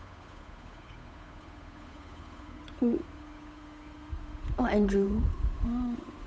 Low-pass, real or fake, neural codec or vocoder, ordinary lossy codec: none; fake; codec, 16 kHz, 8 kbps, FunCodec, trained on Chinese and English, 25 frames a second; none